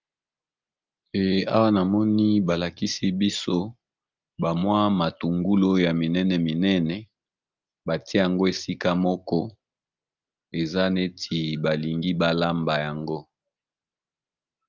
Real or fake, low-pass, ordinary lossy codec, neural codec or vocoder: real; 7.2 kHz; Opus, 24 kbps; none